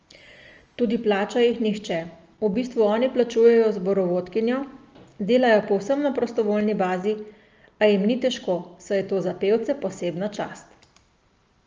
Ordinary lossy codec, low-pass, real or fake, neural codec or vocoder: Opus, 24 kbps; 7.2 kHz; real; none